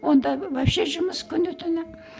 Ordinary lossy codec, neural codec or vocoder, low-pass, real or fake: none; none; none; real